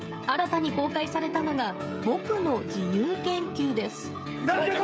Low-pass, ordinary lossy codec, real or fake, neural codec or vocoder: none; none; fake; codec, 16 kHz, 16 kbps, FreqCodec, smaller model